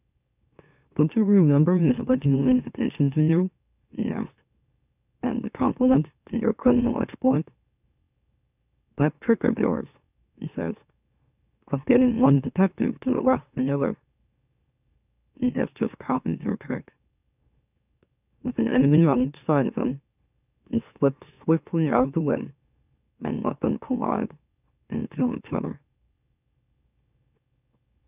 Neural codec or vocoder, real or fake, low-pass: autoencoder, 44.1 kHz, a latent of 192 numbers a frame, MeloTTS; fake; 3.6 kHz